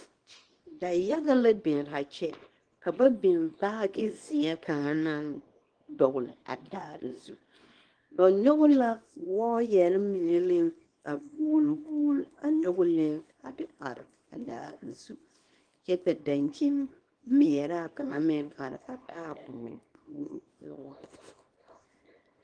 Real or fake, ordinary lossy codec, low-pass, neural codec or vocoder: fake; Opus, 24 kbps; 9.9 kHz; codec, 24 kHz, 0.9 kbps, WavTokenizer, small release